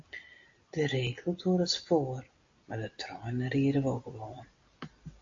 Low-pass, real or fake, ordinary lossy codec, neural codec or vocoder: 7.2 kHz; real; MP3, 48 kbps; none